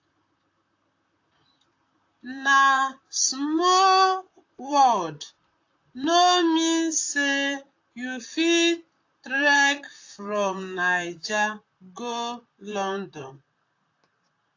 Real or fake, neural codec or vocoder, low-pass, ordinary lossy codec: fake; vocoder, 44.1 kHz, 128 mel bands, Pupu-Vocoder; 7.2 kHz; AAC, 48 kbps